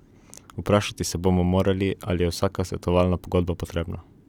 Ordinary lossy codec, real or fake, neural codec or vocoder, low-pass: none; real; none; 19.8 kHz